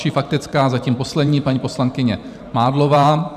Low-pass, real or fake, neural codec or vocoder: 14.4 kHz; fake; vocoder, 44.1 kHz, 128 mel bands every 512 samples, BigVGAN v2